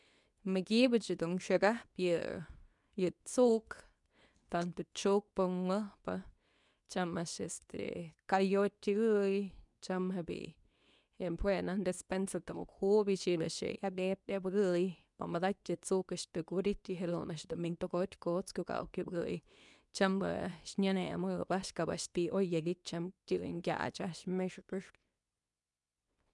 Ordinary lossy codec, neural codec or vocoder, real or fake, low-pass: none; codec, 24 kHz, 0.9 kbps, WavTokenizer, medium speech release version 2; fake; 10.8 kHz